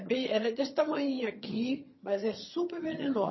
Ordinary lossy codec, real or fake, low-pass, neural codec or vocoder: MP3, 24 kbps; fake; 7.2 kHz; vocoder, 22.05 kHz, 80 mel bands, HiFi-GAN